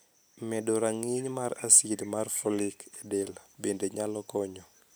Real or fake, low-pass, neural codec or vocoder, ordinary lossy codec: real; none; none; none